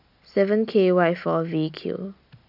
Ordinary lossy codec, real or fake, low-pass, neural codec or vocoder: none; real; 5.4 kHz; none